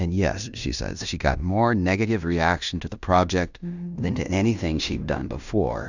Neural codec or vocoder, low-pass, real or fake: codec, 16 kHz in and 24 kHz out, 0.9 kbps, LongCat-Audio-Codec, fine tuned four codebook decoder; 7.2 kHz; fake